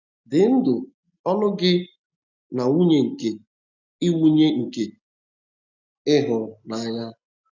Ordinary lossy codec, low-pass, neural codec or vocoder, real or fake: none; 7.2 kHz; none; real